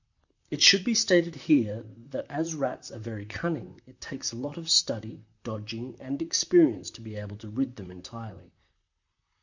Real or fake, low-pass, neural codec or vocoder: fake; 7.2 kHz; vocoder, 44.1 kHz, 128 mel bands, Pupu-Vocoder